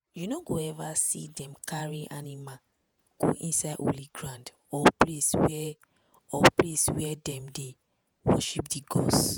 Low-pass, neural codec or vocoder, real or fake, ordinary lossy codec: none; vocoder, 48 kHz, 128 mel bands, Vocos; fake; none